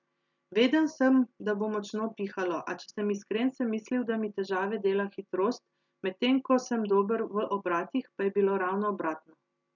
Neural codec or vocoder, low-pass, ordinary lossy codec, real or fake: none; 7.2 kHz; none; real